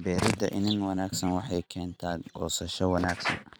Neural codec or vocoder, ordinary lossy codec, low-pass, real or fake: vocoder, 44.1 kHz, 128 mel bands every 256 samples, BigVGAN v2; none; none; fake